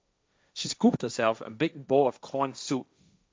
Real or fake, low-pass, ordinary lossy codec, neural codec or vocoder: fake; none; none; codec, 16 kHz, 1.1 kbps, Voila-Tokenizer